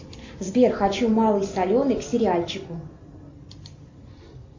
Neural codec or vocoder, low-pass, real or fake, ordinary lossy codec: none; 7.2 kHz; real; MP3, 48 kbps